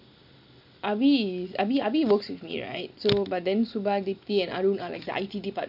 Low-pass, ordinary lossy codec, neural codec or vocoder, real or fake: 5.4 kHz; none; none; real